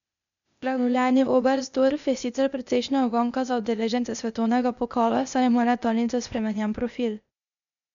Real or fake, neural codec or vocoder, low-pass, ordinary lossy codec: fake; codec, 16 kHz, 0.8 kbps, ZipCodec; 7.2 kHz; none